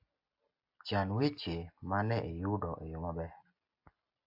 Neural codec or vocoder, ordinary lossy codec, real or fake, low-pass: none; AAC, 48 kbps; real; 5.4 kHz